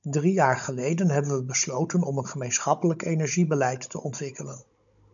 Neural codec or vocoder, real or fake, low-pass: codec, 16 kHz, 16 kbps, FunCodec, trained on Chinese and English, 50 frames a second; fake; 7.2 kHz